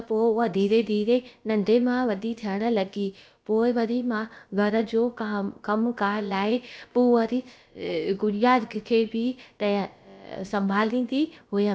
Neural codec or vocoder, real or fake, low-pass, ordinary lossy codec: codec, 16 kHz, about 1 kbps, DyCAST, with the encoder's durations; fake; none; none